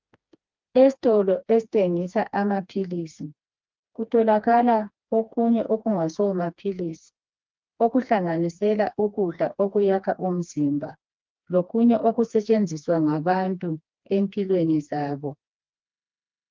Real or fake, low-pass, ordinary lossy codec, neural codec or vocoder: fake; 7.2 kHz; Opus, 24 kbps; codec, 16 kHz, 2 kbps, FreqCodec, smaller model